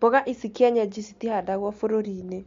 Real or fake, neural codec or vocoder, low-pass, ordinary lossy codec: real; none; 7.2 kHz; MP3, 48 kbps